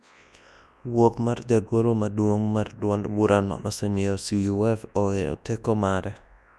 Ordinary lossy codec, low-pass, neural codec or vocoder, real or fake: none; none; codec, 24 kHz, 0.9 kbps, WavTokenizer, large speech release; fake